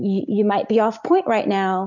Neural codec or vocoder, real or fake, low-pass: none; real; 7.2 kHz